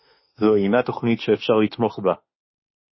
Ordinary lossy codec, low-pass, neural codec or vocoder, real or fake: MP3, 24 kbps; 7.2 kHz; autoencoder, 48 kHz, 32 numbers a frame, DAC-VAE, trained on Japanese speech; fake